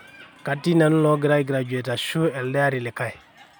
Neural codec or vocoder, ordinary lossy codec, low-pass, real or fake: none; none; none; real